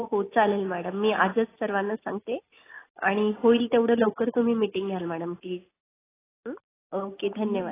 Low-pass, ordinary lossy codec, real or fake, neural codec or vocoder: 3.6 kHz; AAC, 16 kbps; real; none